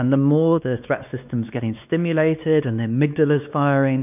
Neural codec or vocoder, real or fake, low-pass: codec, 16 kHz, 2 kbps, X-Codec, WavLM features, trained on Multilingual LibriSpeech; fake; 3.6 kHz